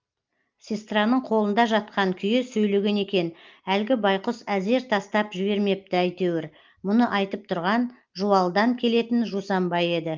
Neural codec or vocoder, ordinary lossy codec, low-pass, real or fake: none; Opus, 32 kbps; 7.2 kHz; real